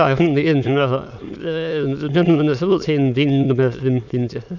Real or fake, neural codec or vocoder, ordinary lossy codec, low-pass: fake; autoencoder, 22.05 kHz, a latent of 192 numbers a frame, VITS, trained on many speakers; none; 7.2 kHz